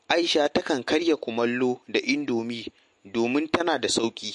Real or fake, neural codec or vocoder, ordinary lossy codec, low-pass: real; none; MP3, 48 kbps; 14.4 kHz